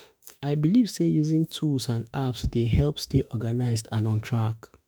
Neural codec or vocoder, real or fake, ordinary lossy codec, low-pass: autoencoder, 48 kHz, 32 numbers a frame, DAC-VAE, trained on Japanese speech; fake; none; none